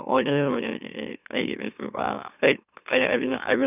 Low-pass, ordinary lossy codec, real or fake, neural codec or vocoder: 3.6 kHz; none; fake; autoencoder, 44.1 kHz, a latent of 192 numbers a frame, MeloTTS